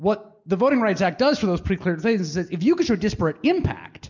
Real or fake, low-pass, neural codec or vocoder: real; 7.2 kHz; none